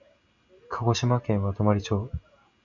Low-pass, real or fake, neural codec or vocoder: 7.2 kHz; real; none